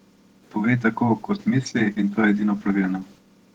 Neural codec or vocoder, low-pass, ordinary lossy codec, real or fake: vocoder, 48 kHz, 128 mel bands, Vocos; 19.8 kHz; Opus, 16 kbps; fake